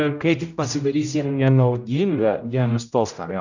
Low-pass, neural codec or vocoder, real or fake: 7.2 kHz; codec, 16 kHz, 0.5 kbps, X-Codec, HuBERT features, trained on general audio; fake